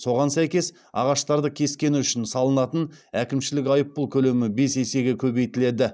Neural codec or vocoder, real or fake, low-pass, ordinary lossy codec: none; real; none; none